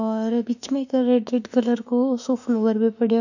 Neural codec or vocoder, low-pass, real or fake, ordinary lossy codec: autoencoder, 48 kHz, 32 numbers a frame, DAC-VAE, trained on Japanese speech; 7.2 kHz; fake; none